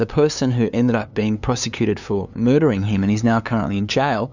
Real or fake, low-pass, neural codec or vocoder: fake; 7.2 kHz; codec, 16 kHz, 2 kbps, FunCodec, trained on LibriTTS, 25 frames a second